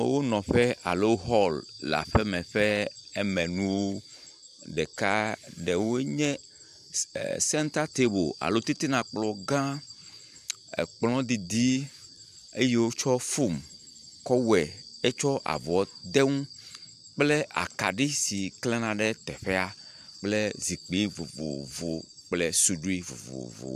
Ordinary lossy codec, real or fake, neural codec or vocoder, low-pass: AAC, 96 kbps; fake; vocoder, 44.1 kHz, 128 mel bands every 512 samples, BigVGAN v2; 14.4 kHz